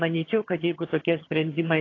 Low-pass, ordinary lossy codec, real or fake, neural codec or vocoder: 7.2 kHz; AAC, 32 kbps; fake; vocoder, 22.05 kHz, 80 mel bands, HiFi-GAN